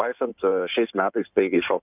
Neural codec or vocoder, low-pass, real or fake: codec, 16 kHz in and 24 kHz out, 2.2 kbps, FireRedTTS-2 codec; 3.6 kHz; fake